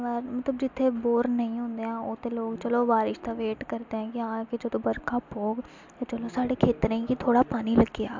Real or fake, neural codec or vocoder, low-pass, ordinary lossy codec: real; none; 7.2 kHz; MP3, 64 kbps